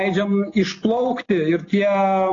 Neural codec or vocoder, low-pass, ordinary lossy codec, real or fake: none; 7.2 kHz; AAC, 32 kbps; real